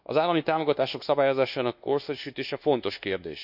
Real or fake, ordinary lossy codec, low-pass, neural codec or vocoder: fake; none; 5.4 kHz; codec, 24 kHz, 1.2 kbps, DualCodec